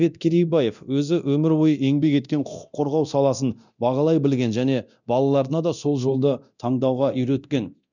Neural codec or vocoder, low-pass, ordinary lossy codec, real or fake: codec, 24 kHz, 0.9 kbps, DualCodec; 7.2 kHz; none; fake